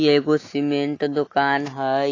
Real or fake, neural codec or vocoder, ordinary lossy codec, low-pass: real; none; AAC, 32 kbps; 7.2 kHz